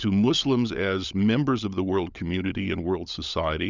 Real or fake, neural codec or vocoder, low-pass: fake; codec, 16 kHz, 16 kbps, FunCodec, trained on LibriTTS, 50 frames a second; 7.2 kHz